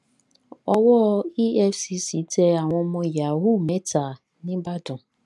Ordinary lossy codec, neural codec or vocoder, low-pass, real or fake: none; none; none; real